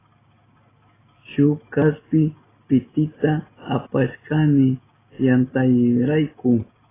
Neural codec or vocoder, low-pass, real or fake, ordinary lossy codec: none; 3.6 kHz; real; AAC, 16 kbps